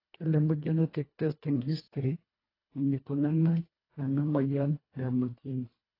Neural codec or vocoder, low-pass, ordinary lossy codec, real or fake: codec, 24 kHz, 1.5 kbps, HILCodec; 5.4 kHz; AAC, 24 kbps; fake